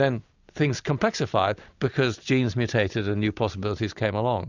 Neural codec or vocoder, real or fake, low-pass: none; real; 7.2 kHz